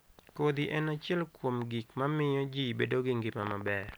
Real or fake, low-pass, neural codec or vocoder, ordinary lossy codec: real; none; none; none